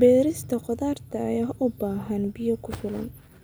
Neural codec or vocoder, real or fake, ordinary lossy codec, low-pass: none; real; none; none